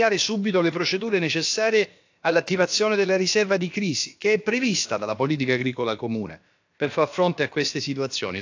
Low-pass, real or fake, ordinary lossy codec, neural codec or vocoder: 7.2 kHz; fake; AAC, 48 kbps; codec, 16 kHz, about 1 kbps, DyCAST, with the encoder's durations